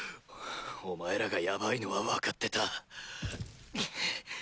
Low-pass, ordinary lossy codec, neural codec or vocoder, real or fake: none; none; none; real